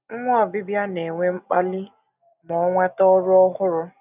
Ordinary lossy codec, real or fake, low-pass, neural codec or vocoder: none; real; 3.6 kHz; none